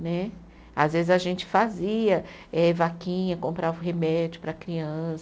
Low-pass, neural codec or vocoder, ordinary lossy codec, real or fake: none; none; none; real